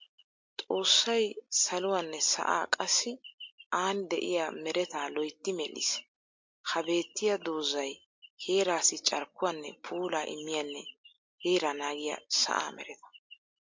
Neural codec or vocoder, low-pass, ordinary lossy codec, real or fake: none; 7.2 kHz; MP3, 48 kbps; real